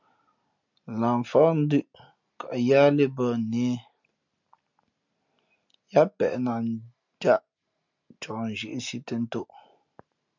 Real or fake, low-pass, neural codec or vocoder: real; 7.2 kHz; none